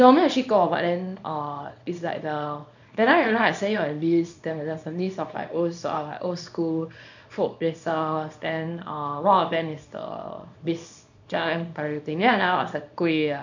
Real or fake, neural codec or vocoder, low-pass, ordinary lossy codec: fake; codec, 24 kHz, 0.9 kbps, WavTokenizer, small release; 7.2 kHz; none